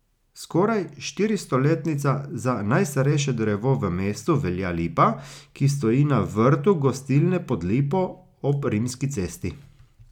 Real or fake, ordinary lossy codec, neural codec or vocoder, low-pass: real; none; none; 19.8 kHz